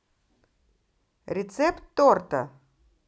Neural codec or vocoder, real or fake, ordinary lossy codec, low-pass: none; real; none; none